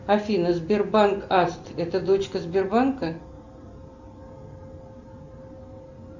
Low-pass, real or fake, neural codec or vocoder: 7.2 kHz; real; none